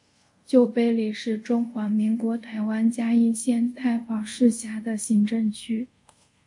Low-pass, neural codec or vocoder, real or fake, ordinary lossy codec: 10.8 kHz; codec, 24 kHz, 0.5 kbps, DualCodec; fake; MP3, 48 kbps